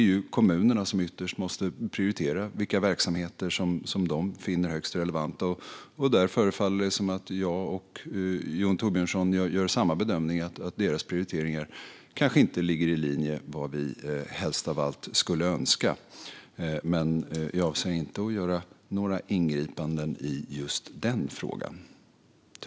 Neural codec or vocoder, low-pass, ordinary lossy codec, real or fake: none; none; none; real